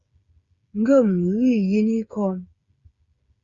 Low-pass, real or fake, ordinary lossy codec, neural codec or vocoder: 7.2 kHz; fake; Opus, 64 kbps; codec, 16 kHz, 8 kbps, FreqCodec, smaller model